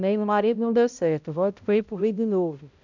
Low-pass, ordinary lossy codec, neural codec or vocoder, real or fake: 7.2 kHz; none; codec, 16 kHz, 0.5 kbps, X-Codec, HuBERT features, trained on balanced general audio; fake